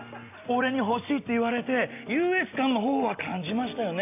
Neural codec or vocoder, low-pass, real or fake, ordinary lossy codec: none; 3.6 kHz; real; AAC, 32 kbps